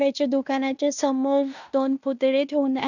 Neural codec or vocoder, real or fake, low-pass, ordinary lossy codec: codec, 16 kHz in and 24 kHz out, 0.9 kbps, LongCat-Audio-Codec, fine tuned four codebook decoder; fake; 7.2 kHz; none